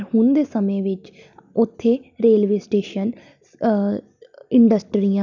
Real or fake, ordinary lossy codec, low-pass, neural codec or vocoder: real; none; 7.2 kHz; none